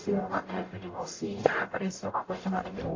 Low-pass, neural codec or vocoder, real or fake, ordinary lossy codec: 7.2 kHz; codec, 44.1 kHz, 0.9 kbps, DAC; fake; AAC, 32 kbps